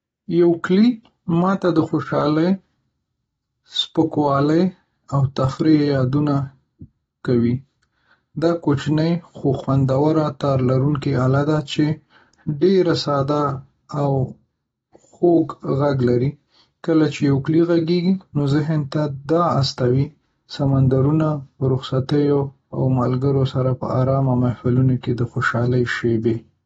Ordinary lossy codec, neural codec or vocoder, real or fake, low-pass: AAC, 24 kbps; none; real; 19.8 kHz